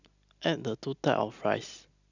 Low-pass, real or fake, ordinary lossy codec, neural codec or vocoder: 7.2 kHz; real; none; none